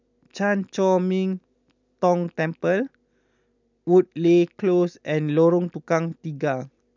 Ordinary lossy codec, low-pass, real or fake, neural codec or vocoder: none; 7.2 kHz; real; none